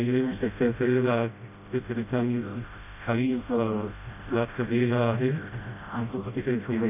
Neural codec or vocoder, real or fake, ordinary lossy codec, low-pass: codec, 16 kHz, 0.5 kbps, FreqCodec, smaller model; fake; none; 3.6 kHz